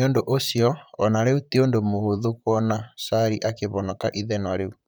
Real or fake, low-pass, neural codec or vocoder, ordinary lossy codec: fake; none; vocoder, 44.1 kHz, 128 mel bands, Pupu-Vocoder; none